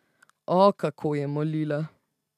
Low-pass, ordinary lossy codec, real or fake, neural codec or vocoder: 14.4 kHz; none; real; none